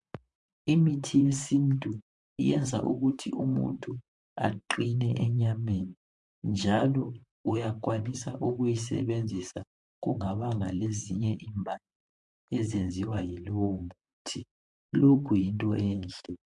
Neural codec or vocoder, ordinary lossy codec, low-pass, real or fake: vocoder, 44.1 kHz, 128 mel bands, Pupu-Vocoder; MP3, 64 kbps; 10.8 kHz; fake